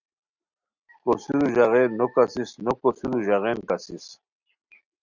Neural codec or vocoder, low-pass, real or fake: none; 7.2 kHz; real